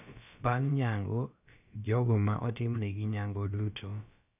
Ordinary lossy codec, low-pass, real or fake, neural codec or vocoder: none; 3.6 kHz; fake; codec, 16 kHz, about 1 kbps, DyCAST, with the encoder's durations